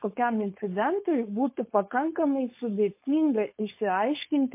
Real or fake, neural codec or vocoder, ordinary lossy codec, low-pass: fake; codec, 16 kHz, 4.8 kbps, FACodec; MP3, 24 kbps; 3.6 kHz